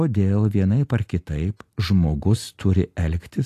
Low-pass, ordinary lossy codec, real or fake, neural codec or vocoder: 14.4 kHz; AAC, 64 kbps; real; none